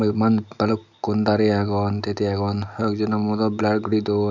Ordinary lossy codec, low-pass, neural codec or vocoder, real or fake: none; 7.2 kHz; none; real